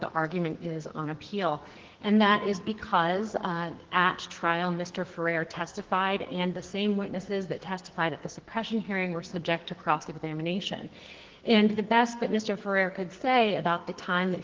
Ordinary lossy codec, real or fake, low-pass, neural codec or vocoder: Opus, 16 kbps; fake; 7.2 kHz; codec, 44.1 kHz, 2.6 kbps, SNAC